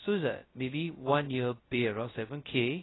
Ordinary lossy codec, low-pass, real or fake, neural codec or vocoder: AAC, 16 kbps; 7.2 kHz; fake; codec, 16 kHz, 0.2 kbps, FocalCodec